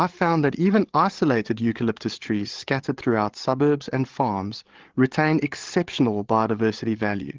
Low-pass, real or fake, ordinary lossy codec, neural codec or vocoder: 7.2 kHz; fake; Opus, 16 kbps; codec, 16 kHz, 8 kbps, FreqCodec, larger model